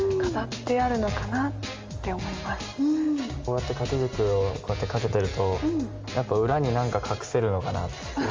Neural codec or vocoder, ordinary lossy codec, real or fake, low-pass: none; Opus, 32 kbps; real; 7.2 kHz